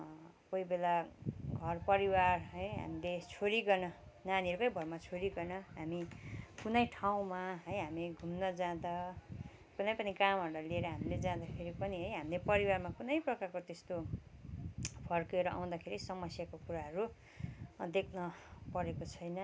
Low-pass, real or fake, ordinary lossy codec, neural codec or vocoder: none; real; none; none